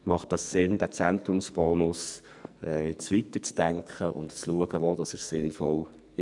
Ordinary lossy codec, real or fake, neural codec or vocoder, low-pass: none; fake; codec, 44.1 kHz, 2.6 kbps, SNAC; 10.8 kHz